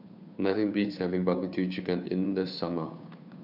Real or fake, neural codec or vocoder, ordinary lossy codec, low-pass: fake; codec, 16 kHz, 2 kbps, FunCodec, trained on Chinese and English, 25 frames a second; none; 5.4 kHz